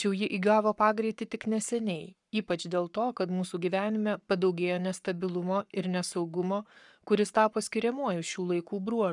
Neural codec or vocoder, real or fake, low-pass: codec, 44.1 kHz, 7.8 kbps, Pupu-Codec; fake; 10.8 kHz